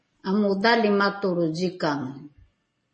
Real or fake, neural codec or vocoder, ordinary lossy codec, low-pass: real; none; MP3, 32 kbps; 10.8 kHz